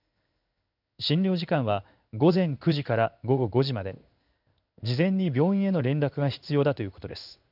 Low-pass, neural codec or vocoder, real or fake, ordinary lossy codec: 5.4 kHz; codec, 16 kHz in and 24 kHz out, 1 kbps, XY-Tokenizer; fake; none